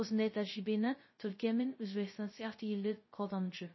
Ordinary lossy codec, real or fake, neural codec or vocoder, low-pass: MP3, 24 kbps; fake; codec, 16 kHz, 0.2 kbps, FocalCodec; 7.2 kHz